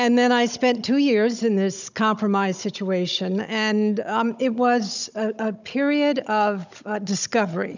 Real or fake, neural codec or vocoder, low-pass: fake; codec, 16 kHz, 16 kbps, FunCodec, trained on Chinese and English, 50 frames a second; 7.2 kHz